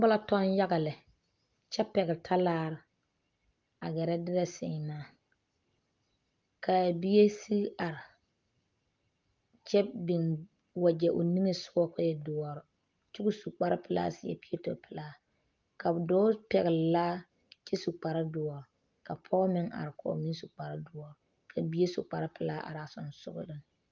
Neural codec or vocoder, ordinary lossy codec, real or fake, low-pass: none; Opus, 32 kbps; real; 7.2 kHz